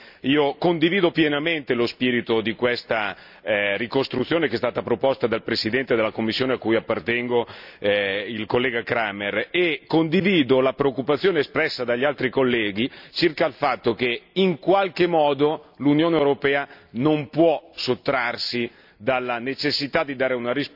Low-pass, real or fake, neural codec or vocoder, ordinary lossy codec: 5.4 kHz; real; none; none